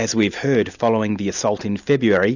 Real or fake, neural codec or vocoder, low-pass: real; none; 7.2 kHz